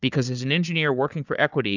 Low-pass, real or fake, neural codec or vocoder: 7.2 kHz; fake; codec, 16 kHz, 4 kbps, FunCodec, trained on Chinese and English, 50 frames a second